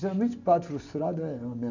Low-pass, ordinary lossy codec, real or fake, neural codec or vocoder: 7.2 kHz; none; real; none